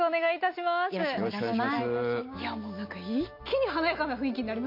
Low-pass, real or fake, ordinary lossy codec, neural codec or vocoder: 5.4 kHz; real; MP3, 32 kbps; none